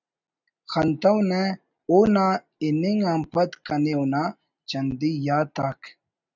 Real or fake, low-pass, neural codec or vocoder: real; 7.2 kHz; none